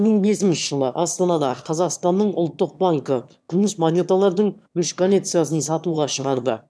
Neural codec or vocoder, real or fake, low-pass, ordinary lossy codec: autoencoder, 22.05 kHz, a latent of 192 numbers a frame, VITS, trained on one speaker; fake; none; none